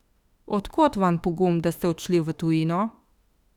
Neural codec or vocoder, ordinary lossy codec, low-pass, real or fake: autoencoder, 48 kHz, 32 numbers a frame, DAC-VAE, trained on Japanese speech; Opus, 64 kbps; 19.8 kHz; fake